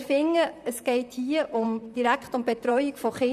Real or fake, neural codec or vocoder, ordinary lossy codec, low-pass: fake; vocoder, 44.1 kHz, 128 mel bands, Pupu-Vocoder; none; 14.4 kHz